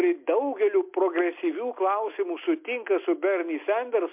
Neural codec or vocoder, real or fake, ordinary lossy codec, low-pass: none; real; MP3, 32 kbps; 3.6 kHz